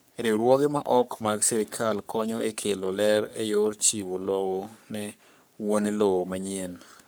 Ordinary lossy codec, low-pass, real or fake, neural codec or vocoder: none; none; fake; codec, 44.1 kHz, 3.4 kbps, Pupu-Codec